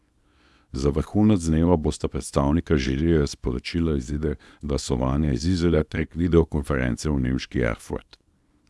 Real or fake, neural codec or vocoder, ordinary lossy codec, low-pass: fake; codec, 24 kHz, 0.9 kbps, WavTokenizer, small release; none; none